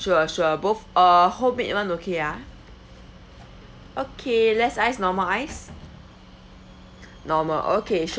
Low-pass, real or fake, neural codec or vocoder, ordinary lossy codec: none; real; none; none